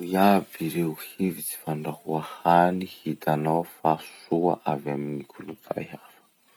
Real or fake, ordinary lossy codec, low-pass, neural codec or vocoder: real; none; none; none